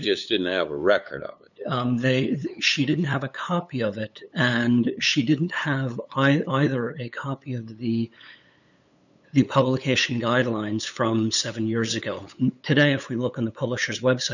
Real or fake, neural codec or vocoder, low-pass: fake; codec, 16 kHz, 8 kbps, FunCodec, trained on LibriTTS, 25 frames a second; 7.2 kHz